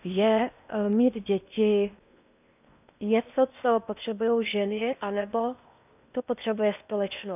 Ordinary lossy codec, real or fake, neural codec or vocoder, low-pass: none; fake; codec, 16 kHz in and 24 kHz out, 0.8 kbps, FocalCodec, streaming, 65536 codes; 3.6 kHz